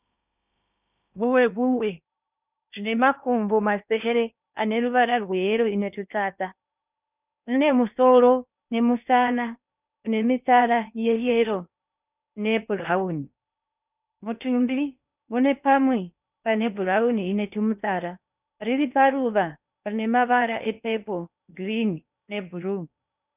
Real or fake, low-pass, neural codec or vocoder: fake; 3.6 kHz; codec, 16 kHz in and 24 kHz out, 0.8 kbps, FocalCodec, streaming, 65536 codes